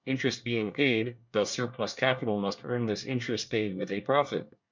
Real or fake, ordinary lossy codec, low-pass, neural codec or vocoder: fake; MP3, 64 kbps; 7.2 kHz; codec, 24 kHz, 1 kbps, SNAC